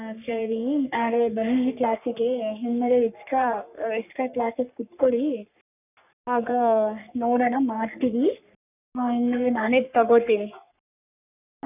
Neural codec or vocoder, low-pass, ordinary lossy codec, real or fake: codec, 44.1 kHz, 3.4 kbps, Pupu-Codec; 3.6 kHz; none; fake